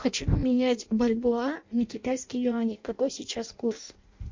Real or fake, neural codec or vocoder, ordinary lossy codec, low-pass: fake; codec, 16 kHz in and 24 kHz out, 0.6 kbps, FireRedTTS-2 codec; MP3, 48 kbps; 7.2 kHz